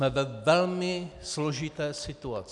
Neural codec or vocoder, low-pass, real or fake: none; 10.8 kHz; real